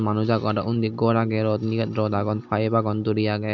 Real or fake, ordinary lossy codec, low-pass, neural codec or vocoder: real; none; 7.2 kHz; none